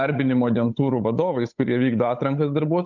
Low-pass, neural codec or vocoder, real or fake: 7.2 kHz; codec, 16 kHz, 8 kbps, FunCodec, trained on Chinese and English, 25 frames a second; fake